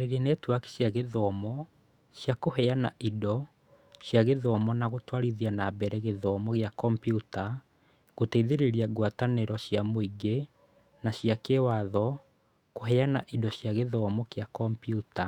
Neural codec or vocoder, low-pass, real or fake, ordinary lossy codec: autoencoder, 48 kHz, 128 numbers a frame, DAC-VAE, trained on Japanese speech; 19.8 kHz; fake; Opus, 64 kbps